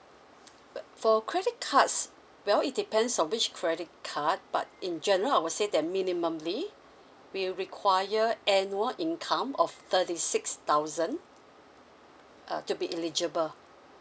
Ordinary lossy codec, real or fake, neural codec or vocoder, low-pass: none; real; none; none